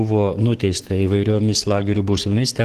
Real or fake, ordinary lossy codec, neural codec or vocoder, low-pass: fake; Opus, 16 kbps; codec, 44.1 kHz, 7.8 kbps, DAC; 14.4 kHz